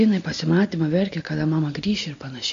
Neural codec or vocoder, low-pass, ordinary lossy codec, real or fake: none; 7.2 kHz; MP3, 48 kbps; real